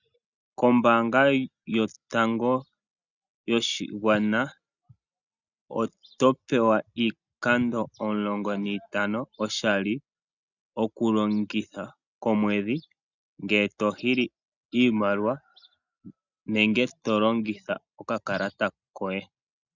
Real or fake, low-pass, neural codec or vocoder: real; 7.2 kHz; none